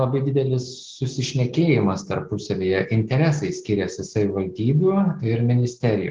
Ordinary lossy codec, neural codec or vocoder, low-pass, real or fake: Opus, 16 kbps; none; 7.2 kHz; real